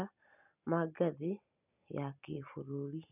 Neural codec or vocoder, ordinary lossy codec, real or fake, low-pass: none; none; real; 3.6 kHz